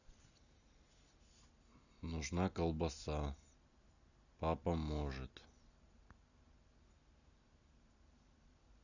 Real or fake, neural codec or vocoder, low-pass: real; none; 7.2 kHz